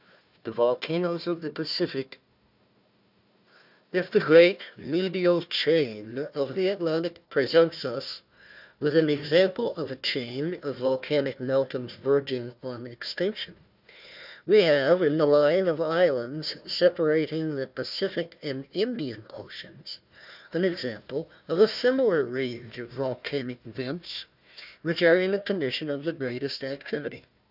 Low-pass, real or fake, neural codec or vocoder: 5.4 kHz; fake; codec, 16 kHz, 1 kbps, FunCodec, trained on Chinese and English, 50 frames a second